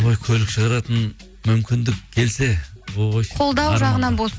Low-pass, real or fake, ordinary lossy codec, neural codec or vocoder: none; real; none; none